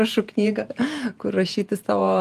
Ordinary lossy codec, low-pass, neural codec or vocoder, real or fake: Opus, 32 kbps; 14.4 kHz; vocoder, 48 kHz, 128 mel bands, Vocos; fake